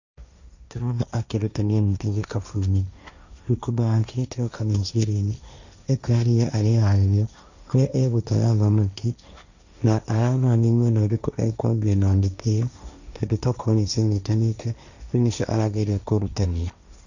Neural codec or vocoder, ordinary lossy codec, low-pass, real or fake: codec, 16 kHz, 1.1 kbps, Voila-Tokenizer; none; 7.2 kHz; fake